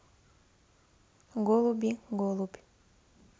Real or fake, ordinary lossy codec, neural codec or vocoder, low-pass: real; none; none; none